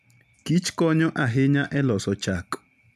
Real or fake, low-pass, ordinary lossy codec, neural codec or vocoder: real; 14.4 kHz; none; none